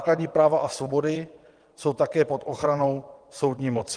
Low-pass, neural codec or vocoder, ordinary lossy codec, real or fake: 9.9 kHz; vocoder, 22.05 kHz, 80 mel bands, WaveNeXt; Opus, 24 kbps; fake